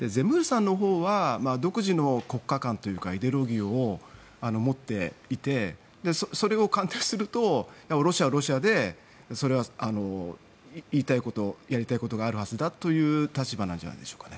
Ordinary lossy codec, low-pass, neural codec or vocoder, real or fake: none; none; none; real